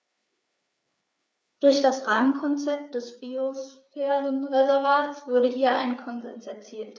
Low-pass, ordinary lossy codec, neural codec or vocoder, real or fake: none; none; codec, 16 kHz, 4 kbps, FreqCodec, larger model; fake